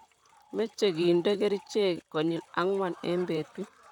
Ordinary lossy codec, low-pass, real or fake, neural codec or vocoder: none; 19.8 kHz; fake; vocoder, 44.1 kHz, 128 mel bands, Pupu-Vocoder